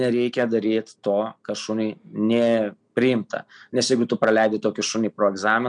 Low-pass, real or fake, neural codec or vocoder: 9.9 kHz; real; none